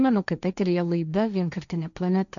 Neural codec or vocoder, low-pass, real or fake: codec, 16 kHz, 1.1 kbps, Voila-Tokenizer; 7.2 kHz; fake